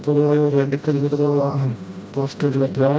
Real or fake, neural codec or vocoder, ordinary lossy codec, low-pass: fake; codec, 16 kHz, 0.5 kbps, FreqCodec, smaller model; none; none